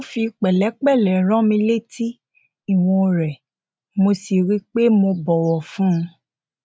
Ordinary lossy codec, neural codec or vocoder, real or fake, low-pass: none; none; real; none